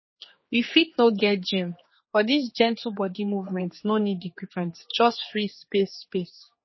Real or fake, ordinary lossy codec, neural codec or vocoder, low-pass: fake; MP3, 24 kbps; codec, 16 kHz, 4 kbps, X-Codec, HuBERT features, trained on general audio; 7.2 kHz